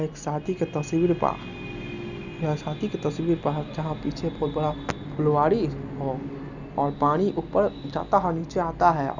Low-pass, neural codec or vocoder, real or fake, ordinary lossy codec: 7.2 kHz; none; real; none